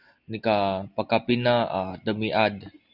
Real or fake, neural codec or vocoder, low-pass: real; none; 5.4 kHz